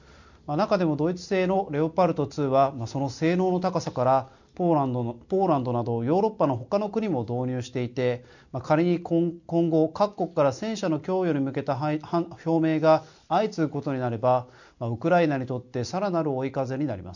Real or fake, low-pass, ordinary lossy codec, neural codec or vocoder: real; 7.2 kHz; none; none